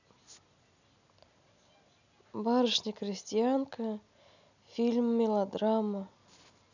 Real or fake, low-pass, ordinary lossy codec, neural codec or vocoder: real; 7.2 kHz; none; none